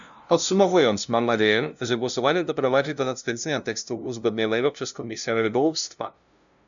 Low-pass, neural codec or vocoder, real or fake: 7.2 kHz; codec, 16 kHz, 0.5 kbps, FunCodec, trained on LibriTTS, 25 frames a second; fake